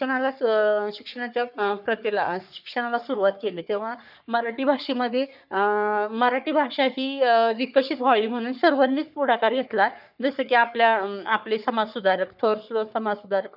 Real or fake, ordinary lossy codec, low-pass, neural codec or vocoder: fake; none; 5.4 kHz; codec, 44.1 kHz, 3.4 kbps, Pupu-Codec